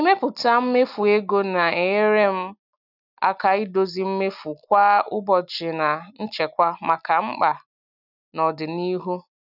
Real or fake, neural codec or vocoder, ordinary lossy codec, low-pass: real; none; none; 5.4 kHz